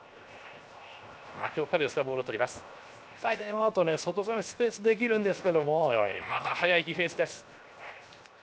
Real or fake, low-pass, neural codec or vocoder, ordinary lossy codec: fake; none; codec, 16 kHz, 0.7 kbps, FocalCodec; none